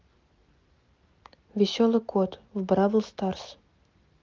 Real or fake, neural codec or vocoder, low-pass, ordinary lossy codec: real; none; 7.2 kHz; Opus, 32 kbps